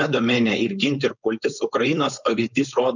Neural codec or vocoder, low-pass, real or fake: codec, 16 kHz, 4.8 kbps, FACodec; 7.2 kHz; fake